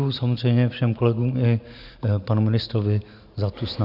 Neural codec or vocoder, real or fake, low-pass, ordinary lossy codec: none; real; 5.4 kHz; AAC, 48 kbps